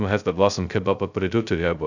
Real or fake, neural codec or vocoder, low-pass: fake; codec, 16 kHz, 0.2 kbps, FocalCodec; 7.2 kHz